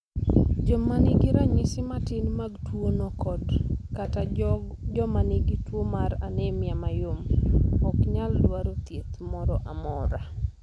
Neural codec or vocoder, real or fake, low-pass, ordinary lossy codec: none; real; none; none